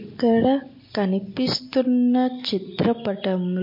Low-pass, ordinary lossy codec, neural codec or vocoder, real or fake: 5.4 kHz; MP3, 32 kbps; none; real